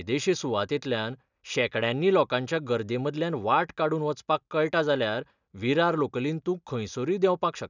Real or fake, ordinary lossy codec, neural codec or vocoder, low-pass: real; none; none; 7.2 kHz